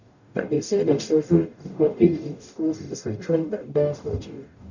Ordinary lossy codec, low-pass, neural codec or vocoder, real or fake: none; 7.2 kHz; codec, 44.1 kHz, 0.9 kbps, DAC; fake